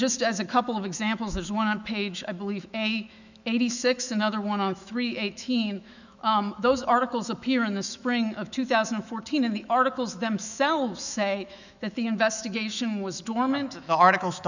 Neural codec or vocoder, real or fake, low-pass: autoencoder, 48 kHz, 128 numbers a frame, DAC-VAE, trained on Japanese speech; fake; 7.2 kHz